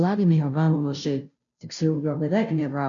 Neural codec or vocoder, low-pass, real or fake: codec, 16 kHz, 0.5 kbps, FunCodec, trained on Chinese and English, 25 frames a second; 7.2 kHz; fake